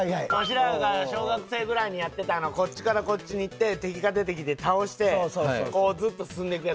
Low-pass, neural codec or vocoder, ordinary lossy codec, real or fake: none; none; none; real